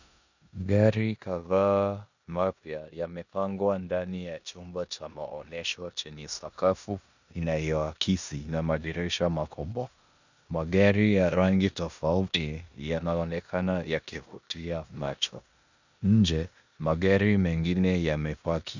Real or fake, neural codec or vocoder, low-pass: fake; codec, 16 kHz in and 24 kHz out, 0.9 kbps, LongCat-Audio-Codec, four codebook decoder; 7.2 kHz